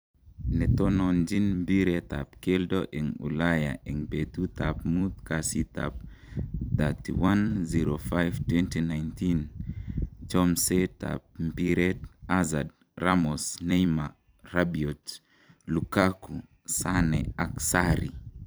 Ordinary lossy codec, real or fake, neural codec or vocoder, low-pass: none; fake; vocoder, 44.1 kHz, 128 mel bands every 256 samples, BigVGAN v2; none